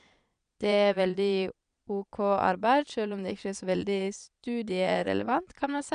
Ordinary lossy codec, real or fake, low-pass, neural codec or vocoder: none; fake; 9.9 kHz; vocoder, 22.05 kHz, 80 mel bands, Vocos